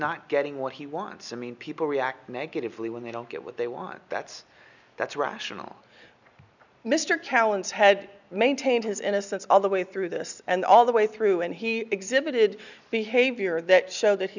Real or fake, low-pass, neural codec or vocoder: real; 7.2 kHz; none